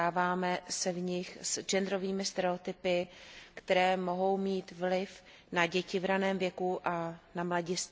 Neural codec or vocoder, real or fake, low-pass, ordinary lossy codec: none; real; none; none